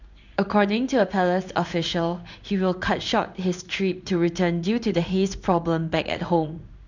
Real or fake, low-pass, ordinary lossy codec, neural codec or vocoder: fake; 7.2 kHz; none; codec, 16 kHz in and 24 kHz out, 1 kbps, XY-Tokenizer